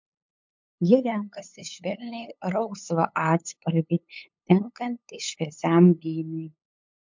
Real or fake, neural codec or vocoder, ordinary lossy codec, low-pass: fake; codec, 16 kHz, 8 kbps, FunCodec, trained on LibriTTS, 25 frames a second; MP3, 64 kbps; 7.2 kHz